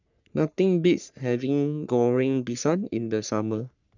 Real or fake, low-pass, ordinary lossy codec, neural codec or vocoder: fake; 7.2 kHz; none; codec, 44.1 kHz, 3.4 kbps, Pupu-Codec